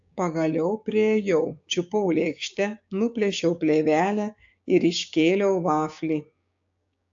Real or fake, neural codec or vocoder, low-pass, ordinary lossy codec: fake; codec, 16 kHz, 6 kbps, DAC; 7.2 kHz; AAC, 64 kbps